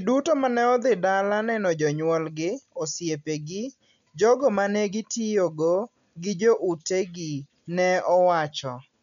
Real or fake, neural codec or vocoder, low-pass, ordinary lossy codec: real; none; 7.2 kHz; none